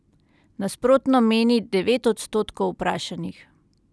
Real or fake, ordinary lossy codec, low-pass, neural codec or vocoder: real; none; none; none